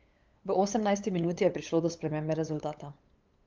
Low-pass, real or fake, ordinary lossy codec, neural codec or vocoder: 7.2 kHz; fake; Opus, 24 kbps; codec, 16 kHz, 8 kbps, FunCodec, trained on LibriTTS, 25 frames a second